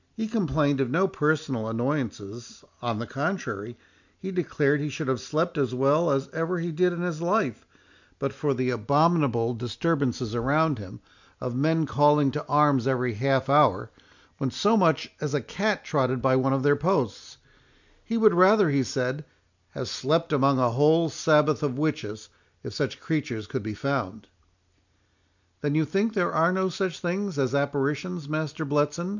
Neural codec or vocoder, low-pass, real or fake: none; 7.2 kHz; real